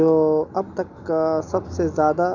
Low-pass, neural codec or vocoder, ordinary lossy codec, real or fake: 7.2 kHz; none; none; real